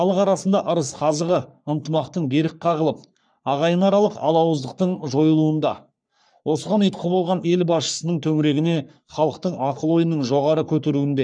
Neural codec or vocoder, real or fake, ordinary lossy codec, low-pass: codec, 44.1 kHz, 3.4 kbps, Pupu-Codec; fake; none; 9.9 kHz